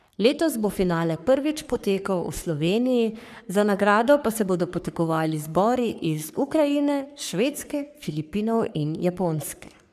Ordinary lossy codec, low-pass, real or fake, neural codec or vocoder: none; 14.4 kHz; fake; codec, 44.1 kHz, 3.4 kbps, Pupu-Codec